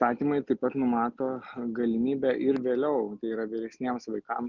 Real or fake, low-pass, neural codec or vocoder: real; 7.2 kHz; none